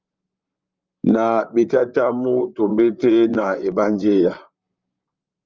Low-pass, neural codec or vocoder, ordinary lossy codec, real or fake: 7.2 kHz; vocoder, 44.1 kHz, 128 mel bands, Pupu-Vocoder; Opus, 32 kbps; fake